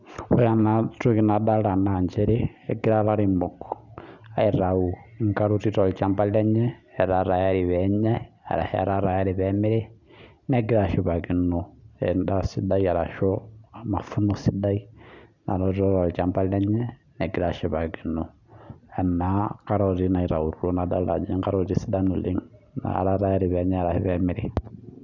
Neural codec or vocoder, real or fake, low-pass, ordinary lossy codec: none; real; 7.2 kHz; none